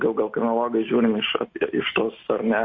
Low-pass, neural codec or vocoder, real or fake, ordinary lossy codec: 7.2 kHz; none; real; MP3, 32 kbps